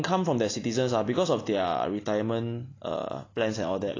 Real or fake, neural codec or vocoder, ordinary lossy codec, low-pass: real; none; AAC, 32 kbps; 7.2 kHz